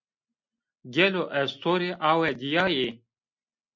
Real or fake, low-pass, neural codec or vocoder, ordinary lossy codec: real; 7.2 kHz; none; MP3, 48 kbps